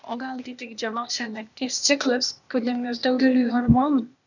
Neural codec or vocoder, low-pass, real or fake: codec, 16 kHz, 0.8 kbps, ZipCodec; 7.2 kHz; fake